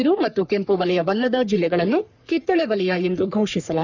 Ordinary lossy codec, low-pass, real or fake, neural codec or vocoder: none; 7.2 kHz; fake; codec, 44.1 kHz, 3.4 kbps, Pupu-Codec